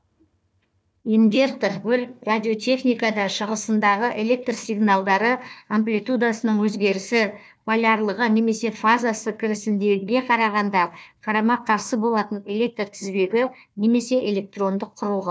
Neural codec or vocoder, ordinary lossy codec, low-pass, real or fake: codec, 16 kHz, 1 kbps, FunCodec, trained on Chinese and English, 50 frames a second; none; none; fake